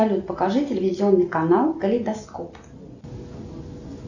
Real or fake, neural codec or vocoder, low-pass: real; none; 7.2 kHz